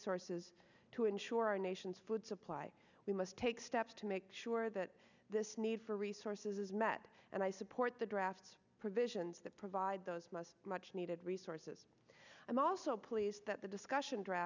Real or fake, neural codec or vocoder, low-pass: real; none; 7.2 kHz